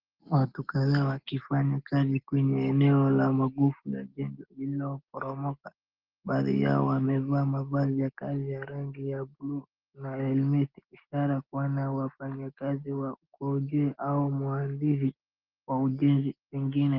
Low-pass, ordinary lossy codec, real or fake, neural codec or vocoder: 5.4 kHz; Opus, 16 kbps; real; none